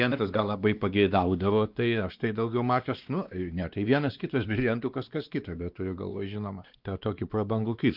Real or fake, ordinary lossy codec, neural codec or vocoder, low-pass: fake; Opus, 32 kbps; codec, 16 kHz, 2 kbps, X-Codec, WavLM features, trained on Multilingual LibriSpeech; 5.4 kHz